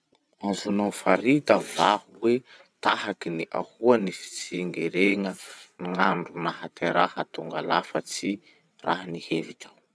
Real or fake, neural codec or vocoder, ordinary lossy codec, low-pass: fake; vocoder, 22.05 kHz, 80 mel bands, WaveNeXt; none; none